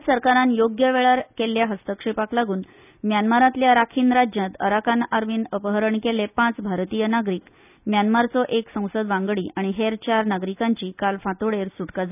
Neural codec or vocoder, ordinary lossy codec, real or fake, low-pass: none; none; real; 3.6 kHz